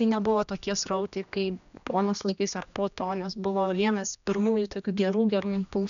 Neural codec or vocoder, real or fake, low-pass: codec, 16 kHz, 1 kbps, X-Codec, HuBERT features, trained on general audio; fake; 7.2 kHz